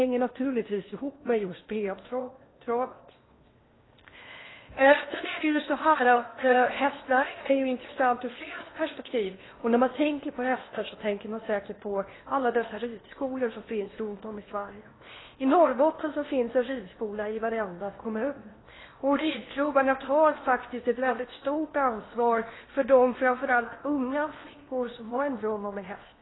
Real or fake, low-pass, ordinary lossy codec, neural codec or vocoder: fake; 7.2 kHz; AAC, 16 kbps; codec, 16 kHz in and 24 kHz out, 0.8 kbps, FocalCodec, streaming, 65536 codes